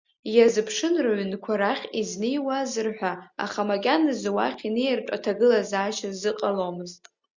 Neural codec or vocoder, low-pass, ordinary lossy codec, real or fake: none; 7.2 kHz; Opus, 64 kbps; real